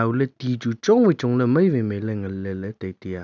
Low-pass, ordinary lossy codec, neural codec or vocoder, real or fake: 7.2 kHz; none; none; real